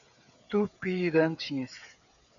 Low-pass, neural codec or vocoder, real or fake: 7.2 kHz; codec, 16 kHz, 8 kbps, FreqCodec, smaller model; fake